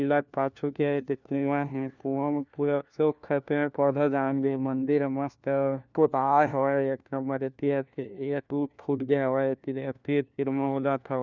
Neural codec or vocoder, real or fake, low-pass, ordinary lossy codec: codec, 16 kHz, 1 kbps, FunCodec, trained on LibriTTS, 50 frames a second; fake; 7.2 kHz; none